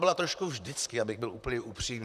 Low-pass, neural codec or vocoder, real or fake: 14.4 kHz; vocoder, 44.1 kHz, 128 mel bands, Pupu-Vocoder; fake